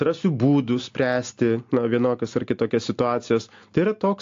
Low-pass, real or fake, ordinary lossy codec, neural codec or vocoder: 7.2 kHz; real; AAC, 48 kbps; none